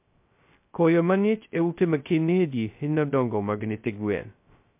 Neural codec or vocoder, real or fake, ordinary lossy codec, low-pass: codec, 16 kHz, 0.2 kbps, FocalCodec; fake; MP3, 32 kbps; 3.6 kHz